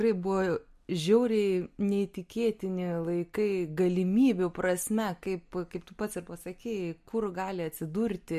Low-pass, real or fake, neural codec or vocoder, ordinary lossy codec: 14.4 kHz; real; none; MP3, 64 kbps